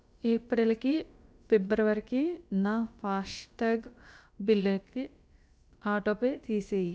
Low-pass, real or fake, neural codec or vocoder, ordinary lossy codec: none; fake; codec, 16 kHz, about 1 kbps, DyCAST, with the encoder's durations; none